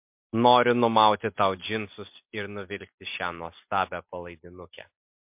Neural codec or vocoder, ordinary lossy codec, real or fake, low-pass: none; MP3, 32 kbps; real; 3.6 kHz